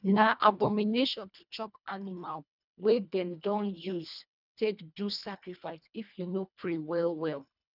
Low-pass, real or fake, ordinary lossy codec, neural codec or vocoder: 5.4 kHz; fake; none; codec, 24 kHz, 1.5 kbps, HILCodec